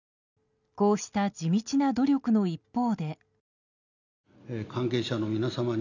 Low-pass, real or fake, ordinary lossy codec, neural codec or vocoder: 7.2 kHz; real; none; none